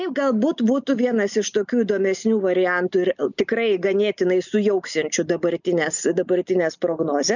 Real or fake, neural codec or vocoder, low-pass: real; none; 7.2 kHz